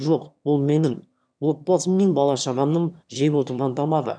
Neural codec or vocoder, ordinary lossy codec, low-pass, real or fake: autoencoder, 22.05 kHz, a latent of 192 numbers a frame, VITS, trained on one speaker; none; 9.9 kHz; fake